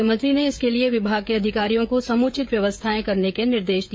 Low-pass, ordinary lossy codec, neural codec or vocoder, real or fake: none; none; codec, 16 kHz, 16 kbps, FreqCodec, smaller model; fake